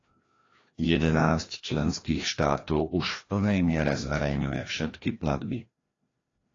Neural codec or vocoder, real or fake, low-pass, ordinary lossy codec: codec, 16 kHz, 1 kbps, FreqCodec, larger model; fake; 7.2 kHz; AAC, 32 kbps